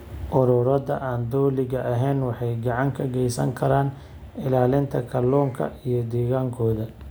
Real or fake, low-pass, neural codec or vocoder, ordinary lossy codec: real; none; none; none